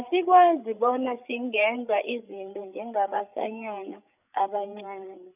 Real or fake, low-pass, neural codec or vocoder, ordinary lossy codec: fake; 3.6 kHz; codec, 16 kHz, 8 kbps, FreqCodec, larger model; none